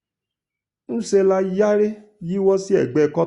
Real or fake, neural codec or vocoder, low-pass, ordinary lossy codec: real; none; 10.8 kHz; Opus, 64 kbps